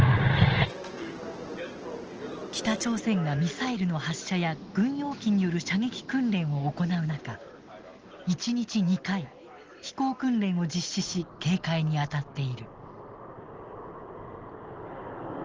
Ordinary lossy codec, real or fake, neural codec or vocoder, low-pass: Opus, 16 kbps; real; none; 7.2 kHz